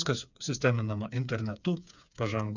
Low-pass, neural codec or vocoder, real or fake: 7.2 kHz; codec, 16 kHz, 4 kbps, FreqCodec, smaller model; fake